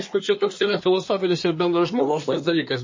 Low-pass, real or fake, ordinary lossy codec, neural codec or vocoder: 7.2 kHz; fake; MP3, 32 kbps; codec, 24 kHz, 1 kbps, SNAC